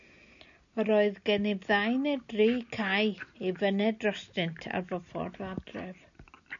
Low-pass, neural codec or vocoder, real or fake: 7.2 kHz; none; real